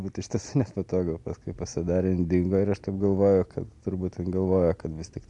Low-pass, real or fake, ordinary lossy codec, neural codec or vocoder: 10.8 kHz; real; MP3, 64 kbps; none